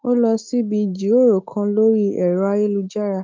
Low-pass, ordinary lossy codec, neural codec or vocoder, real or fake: 7.2 kHz; Opus, 32 kbps; autoencoder, 48 kHz, 128 numbers a frame, DAC-VAE, trained on Japanese speech; fake